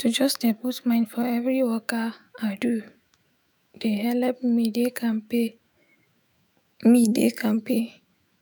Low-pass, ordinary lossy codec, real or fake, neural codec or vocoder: none; none; fake; autoencoder, 48 kHz, 128 numbers a frame, DAC-VAE, trained on Japanese speech